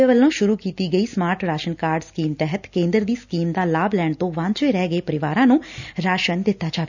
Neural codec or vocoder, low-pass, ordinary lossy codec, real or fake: none; 7.2 kHz; none; real